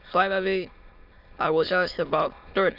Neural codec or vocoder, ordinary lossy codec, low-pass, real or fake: autoencoder, 22.05 kHz, a latent of 192 numbers a frame, VITS, trained on many speakers; Opus, 64 kbps; 5.4 kHz; fake